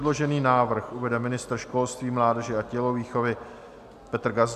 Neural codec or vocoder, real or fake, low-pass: none; real; 14.4 kHz